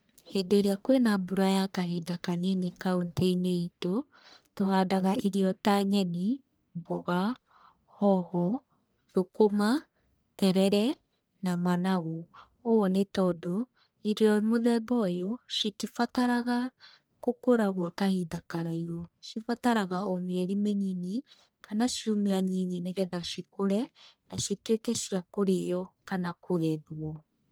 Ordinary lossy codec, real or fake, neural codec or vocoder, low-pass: none; fake; codec, 44.1 kHz, 1.7 kbps, Pupu-Codec; none